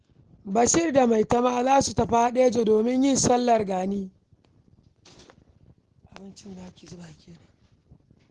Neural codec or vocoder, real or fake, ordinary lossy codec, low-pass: none; real; Opus, 16 kbps; 10.8 kHz